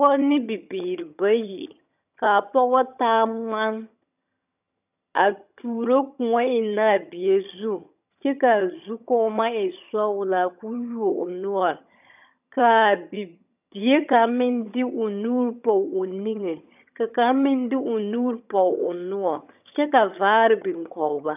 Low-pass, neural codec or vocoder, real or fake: 3.6 kHz; vocoder, 22.05 kHz, 80 mel bands, HiFi-GAN; fake